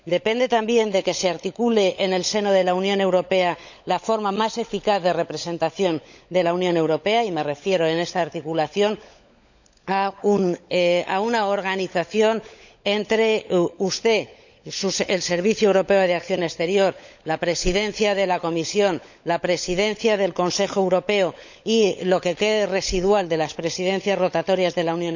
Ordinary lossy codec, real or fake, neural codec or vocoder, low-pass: none; fake; codec, 16 kHz, 16 kbps, FunCodec, trained on LibriTTS, 50 frames a second; 7.2 kHz